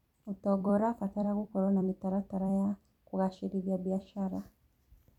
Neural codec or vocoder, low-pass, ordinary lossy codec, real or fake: vocoder, 44.1 kHz, 128 mel bands every 256 samples, BigVGAN v2; 19.8 kHz; none; fake